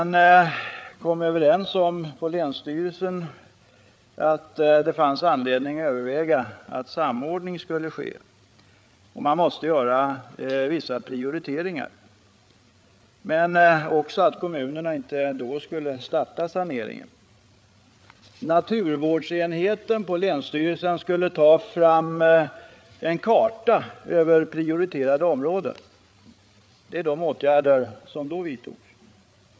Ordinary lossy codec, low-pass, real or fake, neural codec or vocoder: none; none; fake; codec, 16 kHz, 8 kbps, FreqCodec, larger model